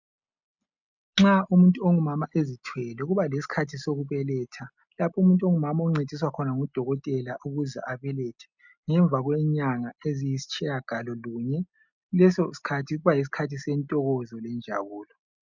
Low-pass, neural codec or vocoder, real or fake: 7.2 kHz; none; real